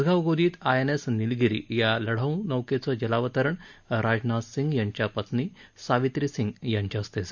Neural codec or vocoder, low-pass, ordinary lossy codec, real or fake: none; 7.2 kHz; none; real